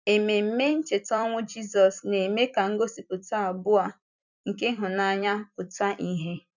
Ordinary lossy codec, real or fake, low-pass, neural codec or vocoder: none; fake; 7.2 kHz; vocoder, 44.1 kHz, 128 mel bands every 256 samples, BigVGAN v2